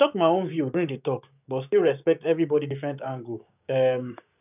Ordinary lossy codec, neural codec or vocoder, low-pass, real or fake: none; codec, 16 kHz, 6 kbps, DAC; 3.6 kHz; fake